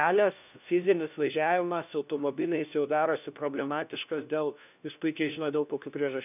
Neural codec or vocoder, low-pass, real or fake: codec, 16 kHz, 1 kbps, FunCodec, trained on LibriTTS, 50 frames a second; 3.6 kHz; fake